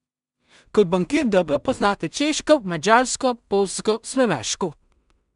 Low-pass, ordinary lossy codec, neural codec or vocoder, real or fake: 10.8 kHz; none; codec, 16 kHz in and 24 kHz out, 0.4 kbps, LongCat-Audio-Codec, two codebook decoder; fake